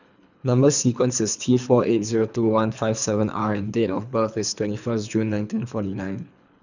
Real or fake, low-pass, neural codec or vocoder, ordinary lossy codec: fake; 7.2 kHz; codec, 24 kHz, 3 kbps, HILCodec; none